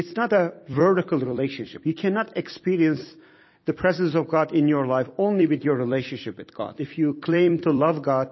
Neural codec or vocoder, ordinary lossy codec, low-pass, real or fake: vocoder, 44.1 kHz, 128 mel bands every 256 samples, BigVGAN v2; MP3, 24 kbps; 7.2 kHz; fake